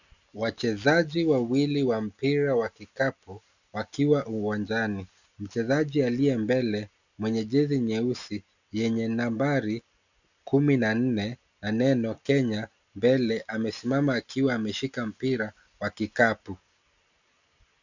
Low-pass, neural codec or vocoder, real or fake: 7.2 kHz; none; real